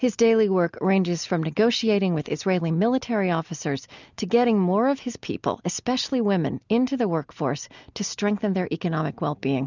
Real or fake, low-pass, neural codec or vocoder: real; 7.2 kHz; none